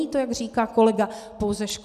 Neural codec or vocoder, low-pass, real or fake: none; 14.4 kHz; real